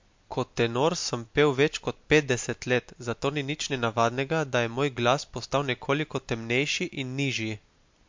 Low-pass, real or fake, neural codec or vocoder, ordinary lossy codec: 7.2 kHz; real; none; MP3, 48 kbps